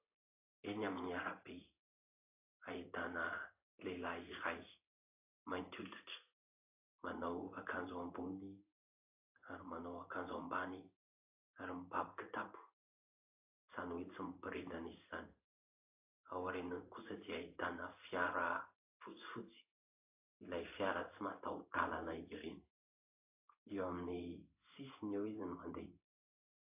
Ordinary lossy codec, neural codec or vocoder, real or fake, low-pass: AAC, 32 kbps; codec, 16 kHz in and 24 kHz out, 1 kbps, XY-Tokenizer; fake; 3.6 kHz